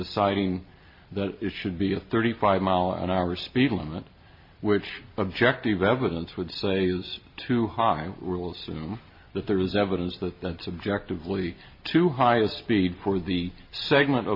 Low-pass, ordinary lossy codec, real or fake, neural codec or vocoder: 5.4 kHz; MP3, 32 kbps; real; none